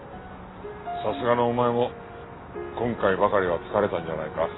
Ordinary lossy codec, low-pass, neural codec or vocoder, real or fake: AAC, 16 kbps; 7.2 kHz; none; real